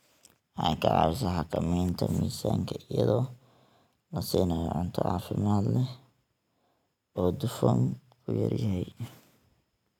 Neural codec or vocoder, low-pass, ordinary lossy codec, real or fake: none; 19.8 kHz; none; real